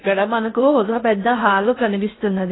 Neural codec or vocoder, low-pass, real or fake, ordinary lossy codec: codec, 16 kHz in and 24 kHz out, 0.6 kbps, FocalCodec, streaming, 4096 codes; 7.2 kHz; fake; AAC, 16 kbps